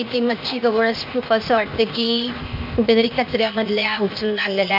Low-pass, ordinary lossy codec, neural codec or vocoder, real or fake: 5.4 kHz; MP3, 48 kbps; codec, 16 kHz, 0.8 kbps, ZipCodec; fake